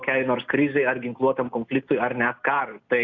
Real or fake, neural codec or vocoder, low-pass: real; none; 7.2 kHz